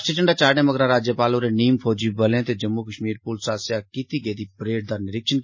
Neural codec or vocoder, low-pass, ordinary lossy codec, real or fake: none; 7.2 kHz; MP3, 32 kbps; real